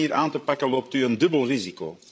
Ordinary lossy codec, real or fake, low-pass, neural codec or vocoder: none; fake; none; codec, 16 kHz, 16 kbps, FreqCodec, larger model